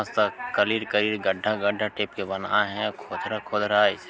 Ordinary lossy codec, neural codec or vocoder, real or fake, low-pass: none; none; real; none